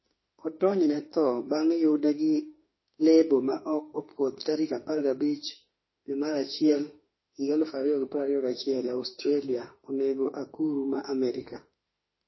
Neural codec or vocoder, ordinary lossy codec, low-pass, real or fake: autoencoder, 48 kHz, 32 numbers a frame, DAC-VAE, trained on Japanese speech; MP3, 24 kbps; 7.2 kHz; fake